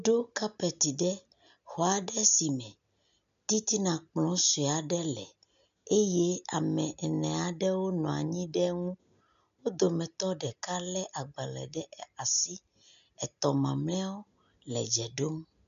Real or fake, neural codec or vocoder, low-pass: real; none; 7.2 kHz